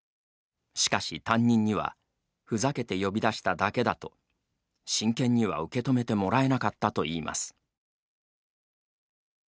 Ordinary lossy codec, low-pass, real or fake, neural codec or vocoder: none; none; real; none